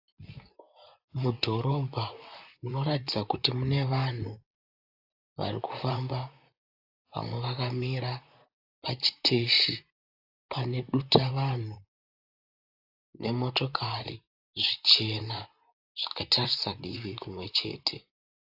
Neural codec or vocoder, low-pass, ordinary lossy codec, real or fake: vocoder, 44.1 kHz, 128 mel bands, Pupu-Vocoder; 5.4 kHz; AAC, 48 kbps; fake